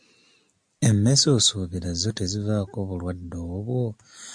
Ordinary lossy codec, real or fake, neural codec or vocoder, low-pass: MP3, 64 kbps; real; none; 9.9 kHz